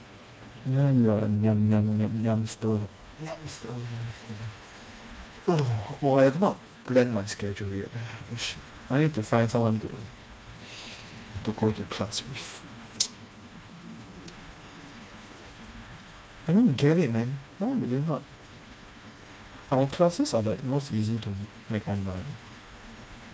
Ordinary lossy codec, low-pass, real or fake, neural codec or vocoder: none; none; fake; codec, 16 kHz, 2 kbps, FreqCodec, smaller model